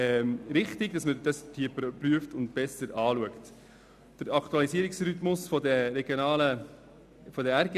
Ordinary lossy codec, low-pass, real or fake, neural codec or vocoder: none; 14.4 kHz; real; none